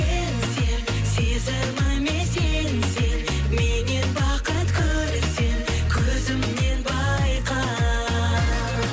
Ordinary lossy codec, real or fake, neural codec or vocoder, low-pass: none; real; none; none